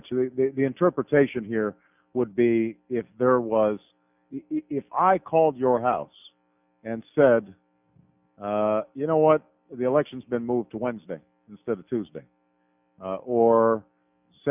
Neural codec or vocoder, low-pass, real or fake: none; 3.6 kHz; real